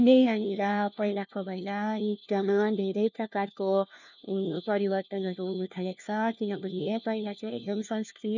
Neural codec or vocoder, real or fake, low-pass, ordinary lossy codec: codec, 16 kHz, 1 kbps, FunCodec, trained on LibriTTS, 50 frames a second; fake; 7.2 kHz; none